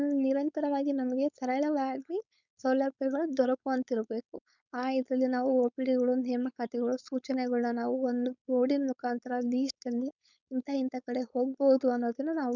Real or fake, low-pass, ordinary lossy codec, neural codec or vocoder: fake; 7.2 kHz; none; codec, 16 kHz, 4.8 kbps, FACodec